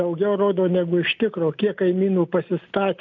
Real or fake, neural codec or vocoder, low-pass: real; none; 7.2 kHz